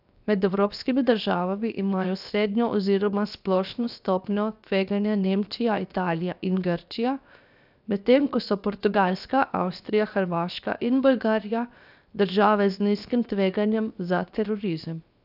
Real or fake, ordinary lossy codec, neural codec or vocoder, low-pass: fake; none; codec, 16 kHz, 0.7 kbps, FocalCodec; 5.4 kHz